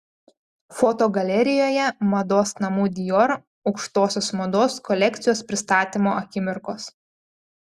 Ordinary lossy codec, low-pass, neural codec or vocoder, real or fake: Opus, 64 kbps; 14.4 kHz; none; real